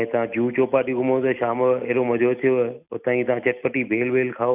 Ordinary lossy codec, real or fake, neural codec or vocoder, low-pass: none; real; none; 3.6 kHz